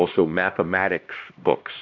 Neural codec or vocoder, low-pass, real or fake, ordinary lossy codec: codec, 16 kHz, 1 kbps, X-Codec, HuBERT features, trained on LibriSpeech; 7.2 kHz; fake; MP3, 64 kbps